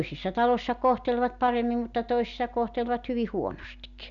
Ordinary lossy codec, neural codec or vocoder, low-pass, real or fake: none; none; 7.2 kHz; real